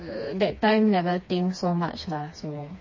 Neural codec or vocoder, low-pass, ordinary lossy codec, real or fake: codec, 16 kHz, 2 kbps, FreqCodec, smaller model; 7.2 kHz; MP3, 32 kbps; fake